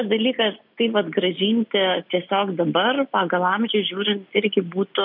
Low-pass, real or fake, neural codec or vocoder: 5.4 kHz; real; none